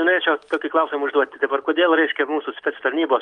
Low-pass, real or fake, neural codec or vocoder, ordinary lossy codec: 9.9 kHz; real; none; Opus, 24 kbps